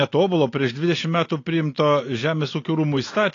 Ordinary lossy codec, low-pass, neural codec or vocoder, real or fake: AAC, 32 kbps; 7.2 kHz; none; real